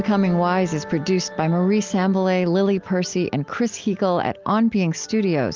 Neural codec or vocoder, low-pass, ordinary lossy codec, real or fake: none; 7.2 kHz; Opus, 32 kbps; real